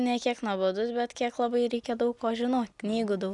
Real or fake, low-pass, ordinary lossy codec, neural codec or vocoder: real; 10.8 kHz; MP3, 96 kbps; none